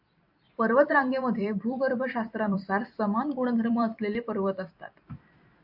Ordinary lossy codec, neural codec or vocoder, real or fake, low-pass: AAC, 48 kbps; vocoder, 44.1 kHz, 128 mel bands every 256 samples, BigVGAN v2; fake; 5.4 kHz